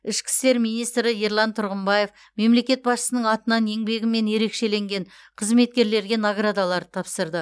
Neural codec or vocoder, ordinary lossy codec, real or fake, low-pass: none; none; real; none